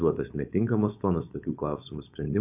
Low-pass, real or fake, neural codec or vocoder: 3.6 kHz; fake; codec, 16 kHz, 4.8 kbps, FACodec